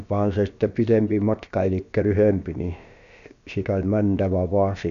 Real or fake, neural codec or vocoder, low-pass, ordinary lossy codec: fake; codec, 16 kHz, about 1 kbps, DyCAST, with the encoder's durations; 7.2 kHz; none